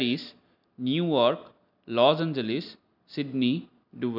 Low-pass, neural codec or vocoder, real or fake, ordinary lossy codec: 5.4 kHz; none; real; none